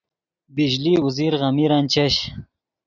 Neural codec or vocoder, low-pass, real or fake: none; 7.2 kHz; real